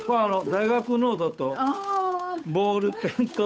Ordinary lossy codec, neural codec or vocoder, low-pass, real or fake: none; codec, 16 kHz, 8 kbps, FunCodec, trained on Chinese and English, 25 frames a second; none; fake